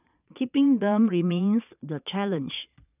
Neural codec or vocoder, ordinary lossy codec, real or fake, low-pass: vocoder, 22.05 kHz, 80 mel bands, Vocos; none; fake; 3.6 kHz